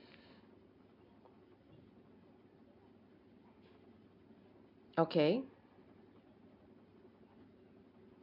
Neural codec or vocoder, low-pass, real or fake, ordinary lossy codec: none; 5.4 kHz; real; MP3, 48 kbps